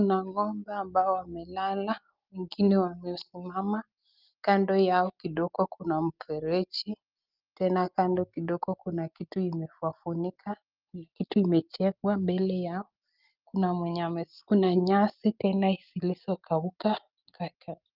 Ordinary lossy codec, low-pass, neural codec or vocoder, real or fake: Opus, 32 kbps; 5.4 kHz; none; real